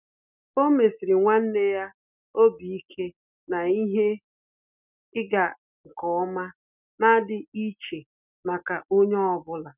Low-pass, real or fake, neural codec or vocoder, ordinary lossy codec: 3.6 kHz; real; none; none